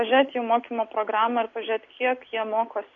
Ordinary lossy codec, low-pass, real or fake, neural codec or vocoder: MP3, 48 kbps; 7.2 kHz; real; none